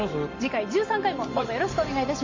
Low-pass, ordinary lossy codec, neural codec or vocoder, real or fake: 7.2 kHz; MP3, 32 kbps; none; real